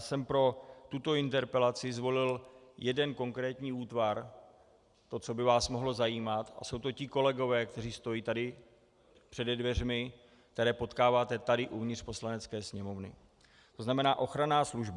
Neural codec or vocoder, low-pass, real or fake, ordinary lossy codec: none; 10.8 kHz; real; Opus, 64 kbps